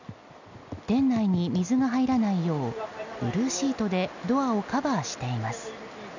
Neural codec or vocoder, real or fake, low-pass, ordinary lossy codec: none; real; 7.2 kHz; none